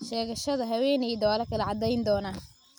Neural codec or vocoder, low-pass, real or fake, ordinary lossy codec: none; none; real; none